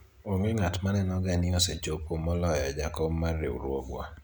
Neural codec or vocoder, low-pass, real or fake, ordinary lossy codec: none; none; real; none